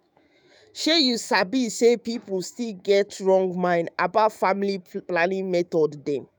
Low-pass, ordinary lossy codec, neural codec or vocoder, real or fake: none; none; autoencoder, 48 kHz, 128 numbers a frame, DAC-VAE, trained on Japanese speech; fake